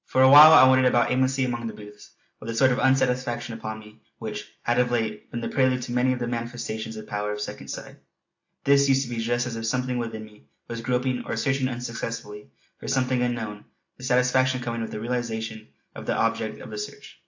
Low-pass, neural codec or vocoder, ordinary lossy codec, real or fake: 7.2 kHz; none; AAC, 48 kbps; real